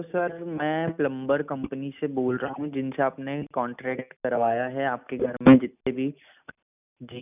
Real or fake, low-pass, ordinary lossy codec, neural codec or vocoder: real; 3.6 kHz; none; none